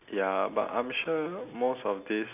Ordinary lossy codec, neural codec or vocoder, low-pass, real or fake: none; none; 3.6 kHz; real